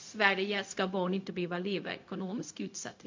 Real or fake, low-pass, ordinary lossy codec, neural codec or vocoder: fake; 7.2 kHz; MP3, 48 kbps; codec, 16 kHz, 0.4 kbps, LongCat-Audio-Codec